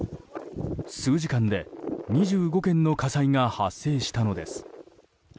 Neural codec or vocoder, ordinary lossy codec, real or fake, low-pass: none; none; real; none